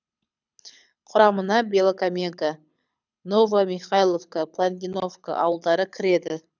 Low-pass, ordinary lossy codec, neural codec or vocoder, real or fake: 7.2 kHz; none; codec, 24 kHz, 6 kbps, HILCodec; fake